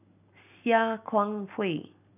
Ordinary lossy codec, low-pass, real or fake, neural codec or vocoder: none; 3.6 kHz; real; none